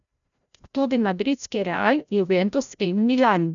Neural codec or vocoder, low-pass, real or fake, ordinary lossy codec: codec, 16 kHz, 0.5 kbps, FreqCodec, larger model; 7.2 kHz; fake; none